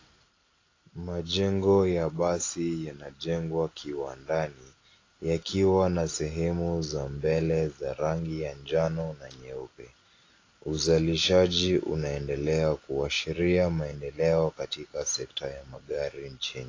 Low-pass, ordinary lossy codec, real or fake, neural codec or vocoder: 7.2 kHz; AAC, 32 kbps; real; none